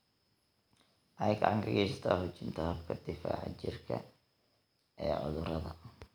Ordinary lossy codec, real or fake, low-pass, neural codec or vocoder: none; real; none; none